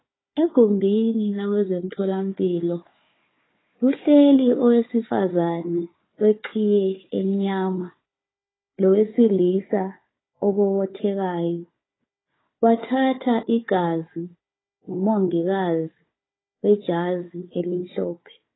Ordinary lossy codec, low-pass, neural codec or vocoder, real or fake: AAC, 16 kbps; 7.2 kHz; codec, 16 kHz, 4 kbps, FunCodec, trained on Chinese and English, 50 frames a second; fake